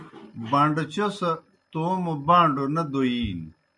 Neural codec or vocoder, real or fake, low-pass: none; real; 10.8 kHz